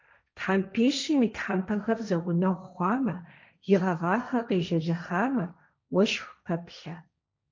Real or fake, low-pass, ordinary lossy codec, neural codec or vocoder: fake; 7.2 kHz; MP3, 64 kbps; codec, 16 kHz, 1.1 kbps, Voila-Tokenizer